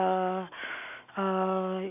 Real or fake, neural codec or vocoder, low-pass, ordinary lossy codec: real; none; 3.6 kHz; none